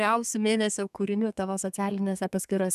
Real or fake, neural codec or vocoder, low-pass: fake; codec, 32 kHz, 1.9 kbps, SNAC; 14.4 kHz